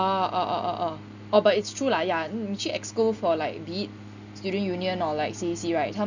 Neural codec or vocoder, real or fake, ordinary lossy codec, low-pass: none; real; none; 7.2 kHz